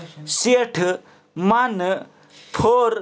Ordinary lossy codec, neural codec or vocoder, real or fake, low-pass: none; none; real; none